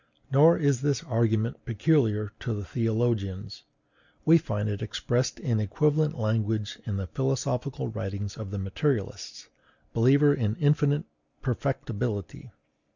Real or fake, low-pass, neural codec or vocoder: real; 7.2 kHz; none